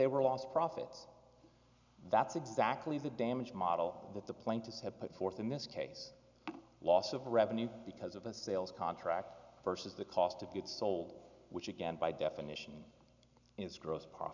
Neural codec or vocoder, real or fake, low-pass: none; real; 7.2 kHz